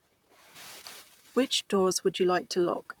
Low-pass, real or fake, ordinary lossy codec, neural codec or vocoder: 19.8 kHz; fake; none; vocoder, 44.1 kHz, 128 mel bands, Pupu-Vocoder